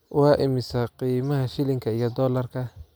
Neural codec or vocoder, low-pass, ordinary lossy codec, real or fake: none; none; none; real